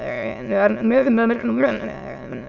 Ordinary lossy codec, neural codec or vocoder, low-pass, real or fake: none; autoencoder, 22.05 kHz, a latent of 192 numbers a frame, VITS, trained on many speakers; 7.2 kHz; fake